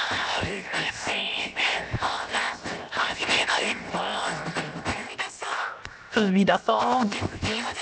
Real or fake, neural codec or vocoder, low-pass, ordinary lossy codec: fake; codec, 16 kHz, 0.7 kbps, FocalCodec; none; none